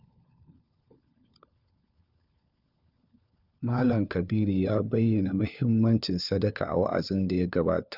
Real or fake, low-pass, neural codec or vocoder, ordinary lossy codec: fake; 5.4 kHz; codec, 16 kHz, 4 kbps, FunCodec, trained on LibriTTS, 50 frames a second; none